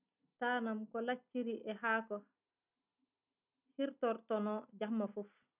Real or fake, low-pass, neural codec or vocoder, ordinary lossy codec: real; 3.6 kHz; none; none